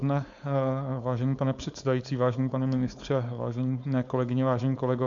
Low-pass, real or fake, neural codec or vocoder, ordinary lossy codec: 7.2 kHz; fake; codec, 16 kHz, 4.8 kbps, FACodec; AAC, 64 kbps